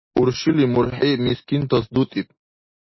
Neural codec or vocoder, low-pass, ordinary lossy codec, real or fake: none; 7.2 kHz; MP3, 24 kbps; real